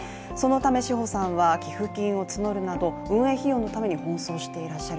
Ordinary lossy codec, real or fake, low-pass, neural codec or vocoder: none; real; none; none